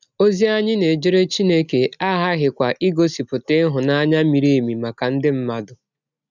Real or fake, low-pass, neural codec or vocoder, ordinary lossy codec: real; 7.2 kHz; none; none